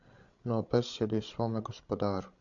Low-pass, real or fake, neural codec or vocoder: 7.2 kHz; fake; codec, 16 kHz, 16 kbps, FreqCodec, larger model